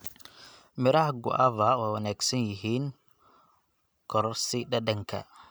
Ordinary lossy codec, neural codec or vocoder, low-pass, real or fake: none; none; none; real